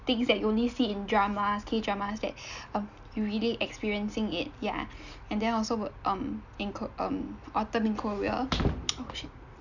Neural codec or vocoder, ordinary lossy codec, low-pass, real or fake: none; none; 7.2 kHz; real